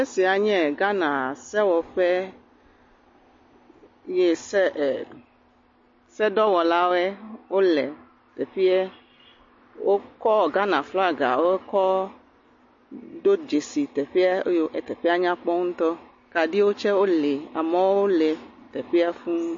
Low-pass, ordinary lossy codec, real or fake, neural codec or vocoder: 7.2 kHz; MP3, 32 kbps; real; none